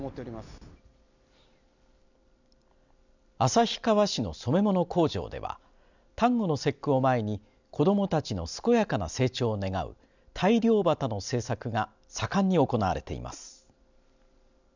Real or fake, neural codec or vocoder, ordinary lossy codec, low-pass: real; none; none; 7.2 kHz